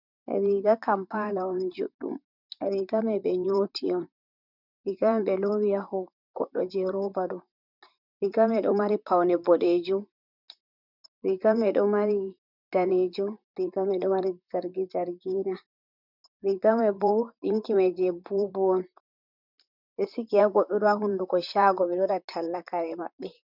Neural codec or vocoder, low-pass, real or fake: vocoder, 44.1 kHz, 128 mel bands, Pupu-Vocoder; 5.4 kHz; fake